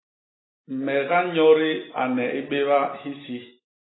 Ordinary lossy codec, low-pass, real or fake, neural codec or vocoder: AAC, 16 kbps; 7.2 kHz; real; none